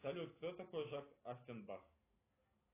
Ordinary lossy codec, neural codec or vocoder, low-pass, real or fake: AAC, 24 kbps; none; 3.6 kHz; real